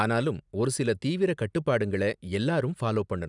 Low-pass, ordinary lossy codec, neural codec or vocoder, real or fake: 10.8 kHz; none; none; real